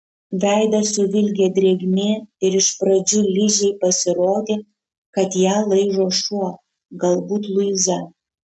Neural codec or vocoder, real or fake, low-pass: none; real; 10.8 kHz